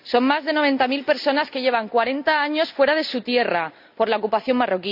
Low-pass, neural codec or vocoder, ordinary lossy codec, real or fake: 5.4 kHz; none; none; real